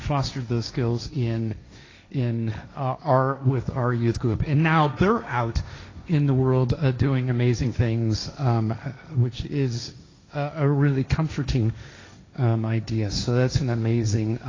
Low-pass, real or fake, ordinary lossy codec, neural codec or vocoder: 7.2 kHz; fake; AAC, 32 kbps; codec, 16 kHz, 1.1 kbps, Voila-Tokenizer